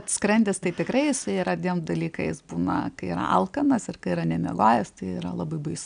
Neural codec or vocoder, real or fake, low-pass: none; real; 9.9 kHz